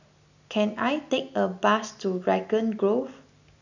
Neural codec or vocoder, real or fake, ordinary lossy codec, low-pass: none; real; none; 7.2 kHz